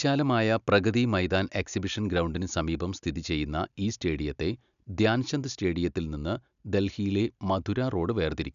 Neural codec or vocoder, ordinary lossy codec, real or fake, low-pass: none; none; real; 7.2 kHz